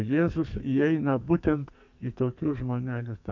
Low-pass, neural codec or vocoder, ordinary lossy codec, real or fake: 7.2 kHz; codec, 44.1 kHz, 2.6 kbps, SNAC; MP3, 64 kbps; fake